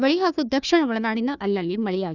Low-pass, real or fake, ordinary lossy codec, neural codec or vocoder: 7.2 kHz; fake; none; codec, 16 kHz, 1 kbps, FunCodec, trained on Chinese and English, 50 frames a second